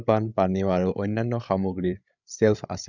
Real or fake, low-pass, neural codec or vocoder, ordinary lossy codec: fake; 7.2 kHz; codec, 16 kHz, 8 kbps, FreqCodec, larger model; none